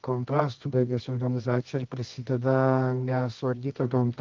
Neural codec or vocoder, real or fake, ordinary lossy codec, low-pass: codec, 24 kHz, 0.9 kbps, WavTokenizer, medium music audio release; fake; Opus, 32 kbps; 7.2 kHz